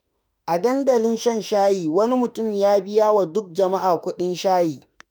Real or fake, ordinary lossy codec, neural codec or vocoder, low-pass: fake; none; autoencoder, 48 kHz, 32 numbers a frame, DAC-VAE, trained on Japanese speech; none